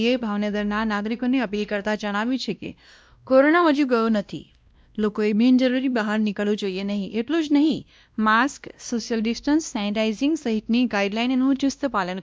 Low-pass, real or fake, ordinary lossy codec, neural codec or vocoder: none; fake; none; codec, 16 kHz, 1 kbps, X-Codec, WavLM features, trained on Multilingual LibriSpeech